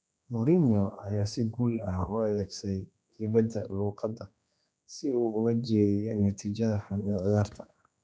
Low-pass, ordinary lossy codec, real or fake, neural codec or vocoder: none; none; fake; codec, 16 kHz, 2 kbps, X-Codec, HuBERT features, trained on general audio